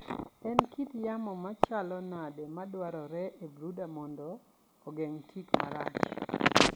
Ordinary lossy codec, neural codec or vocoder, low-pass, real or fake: none; none; none; real